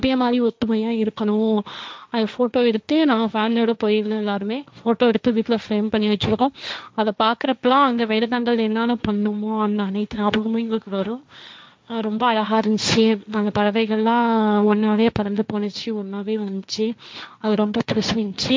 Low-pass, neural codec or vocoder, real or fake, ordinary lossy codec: none; codec, 16 kHz, 1.1 kbps, Voila-Tokenizer; fake; none